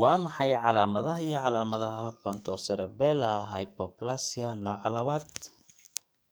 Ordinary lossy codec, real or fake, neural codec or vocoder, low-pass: none; fake; codec, 44.1 kHz, 2.6 kbps, SNAC; none